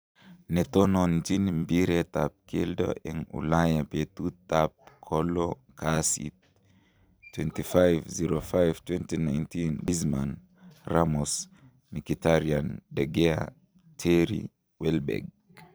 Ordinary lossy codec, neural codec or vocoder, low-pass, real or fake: none; vocoder, 44.1 kHz, 128 mel bands every 256 samples, BigVGAN v2; none; fake